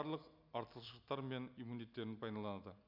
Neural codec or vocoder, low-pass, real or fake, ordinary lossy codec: none; 5.4 kHz; real; none